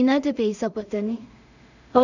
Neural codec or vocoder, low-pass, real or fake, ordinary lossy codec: codec, 16 kHz in and 24 kHz out, 0.4 kbps, LongCat-Audio-Codec, two codebook decoder; 7.2 kHz; fake; none